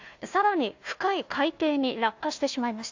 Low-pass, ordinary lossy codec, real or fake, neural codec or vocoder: 7.2 kHz; AAC, 48 kbps; fake; codec, 16 kHz, 1 kbps, FunCodec, trained on Chinese and English, 50 frames a second